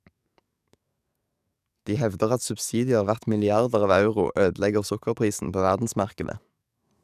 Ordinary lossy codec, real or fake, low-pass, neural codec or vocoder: none; fake; 14.4 kHz; codec, 44.1 kHz, 7.8 kbps, DAC